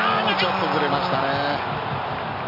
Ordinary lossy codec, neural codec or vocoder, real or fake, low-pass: none; none; real; 5.4 kHz